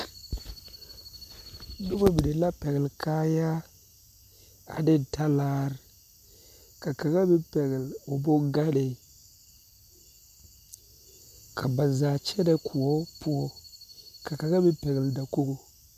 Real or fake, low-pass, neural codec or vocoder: real; 14.4 kHz; none